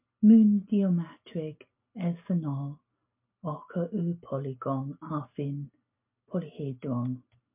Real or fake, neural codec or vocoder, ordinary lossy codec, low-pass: real; none; MP3, 32 kbps; 3.6 kHz